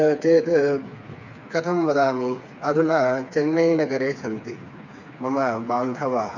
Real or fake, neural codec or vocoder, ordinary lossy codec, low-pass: fake; codec, 16 kHz, 4 kbps, FreqCodec, smaller model; none; 7.2 kHz